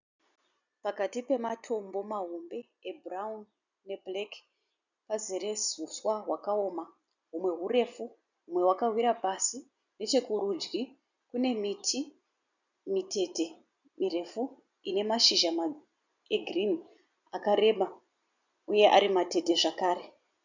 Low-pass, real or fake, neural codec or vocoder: 7.2 kHz; real; none